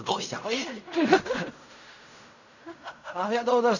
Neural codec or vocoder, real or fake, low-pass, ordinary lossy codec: codec, 16 kHz in and 24 kHz out, 0.4 kbps, LongCat-Audio-Codec, fine tuned four codebook decoder; fake; 7.2 kHz; none